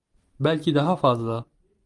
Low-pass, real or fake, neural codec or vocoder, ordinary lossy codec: 10.8 kHz; fake; vocoder, 44.1 kHz, 128 mel bands every 512 samples, BigVGAN v2; Opus, 32 kbps